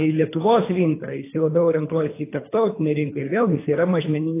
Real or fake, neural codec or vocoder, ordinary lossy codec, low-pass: fake; codec, 24 kHz, 3 kbps, HILCodec; AAC, 24 kbps; 3.6 kHz